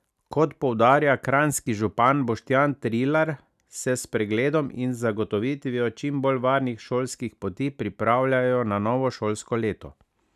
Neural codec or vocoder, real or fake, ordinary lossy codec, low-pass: none; real; none; 14.4 kHz